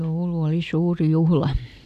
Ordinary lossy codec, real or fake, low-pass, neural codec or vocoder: none; real; 14.4 kHz; none